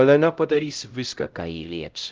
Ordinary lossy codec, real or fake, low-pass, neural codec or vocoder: Opus, 24 kbps; fake; 7.2 kHz; codec, 16 kHz, 0.5 kbps, X-Codec, HuBERT features, trained on LibriSpeech